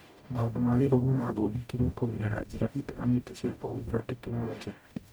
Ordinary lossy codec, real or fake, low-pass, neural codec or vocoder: none; fake; none; codec, 44.1 kHz, 0.9 kbps, DAC